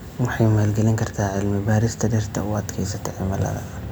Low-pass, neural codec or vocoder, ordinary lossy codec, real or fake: none; none; none; real